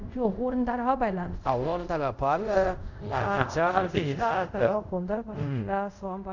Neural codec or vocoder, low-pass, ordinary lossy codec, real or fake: codec, 24 kHz, 0.5 kbps, DualCodec; 7.2 kHz; none; fake